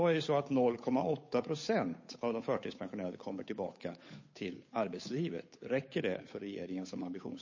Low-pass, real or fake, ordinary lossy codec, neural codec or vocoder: 7.2 kHz; fake; MP3, 32 kbps; codec, 16 kHz, 8 kbps, FunCodec, trained on Chinese and English, 25 frames a second